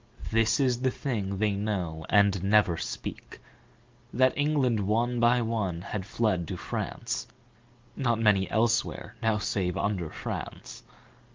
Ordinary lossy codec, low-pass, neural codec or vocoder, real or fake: Opus, 32 kbps; 7.2 kHz; none; real